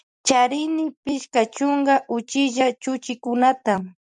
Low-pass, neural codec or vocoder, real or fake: 10.8 kHz; vocoder, 44.1 kHz, 128 mel bands every 256 samples, BigVGAN v2; fake